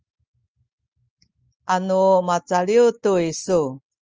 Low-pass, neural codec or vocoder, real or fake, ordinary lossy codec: 7.2 kHz; none; real; Opus, 24 kbps